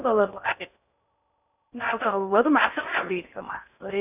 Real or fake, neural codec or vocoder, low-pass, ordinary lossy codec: fake; codec, 16 kHz in and 24 kHz out, 0.6 kbps, FocalCodec, streaming, 4096 codes; 3.6 kHz; none